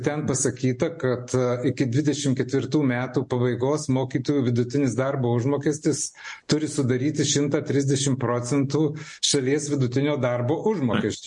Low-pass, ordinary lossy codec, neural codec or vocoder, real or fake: 10.8 kHz; MP3, 48 kbps; none; real